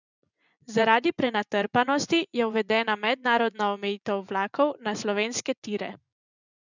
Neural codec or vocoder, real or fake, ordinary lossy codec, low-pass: vocoder, 24 kHz, 100 mel bands, Vocos; fake; none; 7.2 kHz